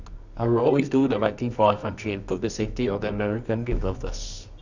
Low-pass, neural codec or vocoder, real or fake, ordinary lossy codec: 7.2 kHz; codec, 24 kHz, 0.9 kbps, WavTokenizer, medium music audio release; fake; none